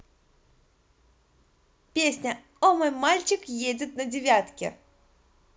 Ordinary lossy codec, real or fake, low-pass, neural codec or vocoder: none; real; none; none